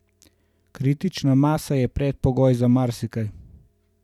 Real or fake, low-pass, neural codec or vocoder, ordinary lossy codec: fake; 19.8 kHz; vocoder, 44.1 kHz, 128 mel bands every 512 samples, BigVGAN v2; none